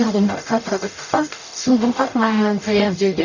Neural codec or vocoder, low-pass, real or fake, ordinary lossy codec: codec, 44.1 kHz, 0.9 kbps, DAC; 7.2 kHz; fake; none